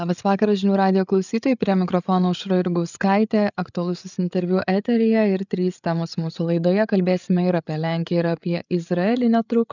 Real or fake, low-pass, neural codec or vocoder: fake; 7.2 kHz; codec, 16 kHz, 8 kbps, FreqCodec, larger model